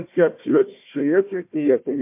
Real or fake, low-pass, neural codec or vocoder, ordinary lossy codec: fake; 3.6 kHz; codec, 16 kHz, 1 kbps, FunCodec, trained on Chinese and English, 50 frames a second; MP3, 32 kbps